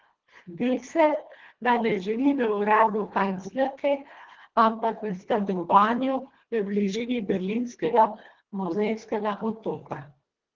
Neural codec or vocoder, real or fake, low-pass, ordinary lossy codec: codec, 24 kHz, 1.5 kbps, HILCodec; fake; 7.2 kHz; Opus, 16 kbps